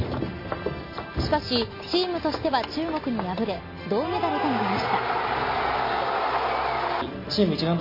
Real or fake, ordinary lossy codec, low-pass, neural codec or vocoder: real; MP3, 24 kbps; 5.4 kHz; none